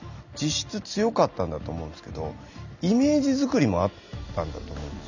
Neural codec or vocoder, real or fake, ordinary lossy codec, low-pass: none; real; none; 7.2 kHz